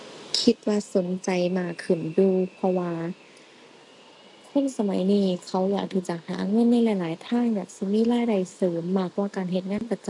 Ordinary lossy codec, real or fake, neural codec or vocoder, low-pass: AAC, 64 kbps; real; none; 10.8 kHz